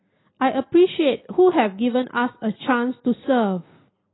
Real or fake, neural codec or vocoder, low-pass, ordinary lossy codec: real; none; 7.2 kHz; AAC, 16 kbps